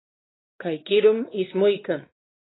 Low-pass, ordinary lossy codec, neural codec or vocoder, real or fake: 7.2 kHz; AAC, 16 kbps; codec, 16 kHz, 2 kbps, X-Codec, WavLM features, trained on Multilingual LibriSpeech; fake